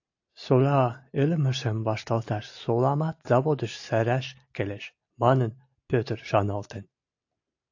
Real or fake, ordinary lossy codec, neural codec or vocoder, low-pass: real; AAC, 48 kbps; none; 7.2 kHz